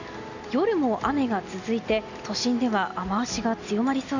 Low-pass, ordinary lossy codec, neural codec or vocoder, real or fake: 7.2 kHz; none; none; real